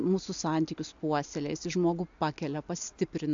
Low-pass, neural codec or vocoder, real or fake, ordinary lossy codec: 7.2 kHz; none; real; AAC, 64 kbps